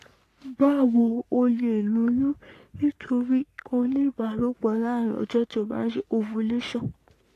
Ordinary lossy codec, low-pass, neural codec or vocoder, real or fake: AAC, 64 kbps; 14.4 kHz; codec, 44.1 kHz, 3.4 kbps, Pupu-Codec; fake